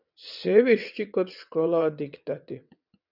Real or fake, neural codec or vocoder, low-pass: fake; vocoder, 22.05 kHz, 80 mel bands, WaveNeXt; 5.4 kHz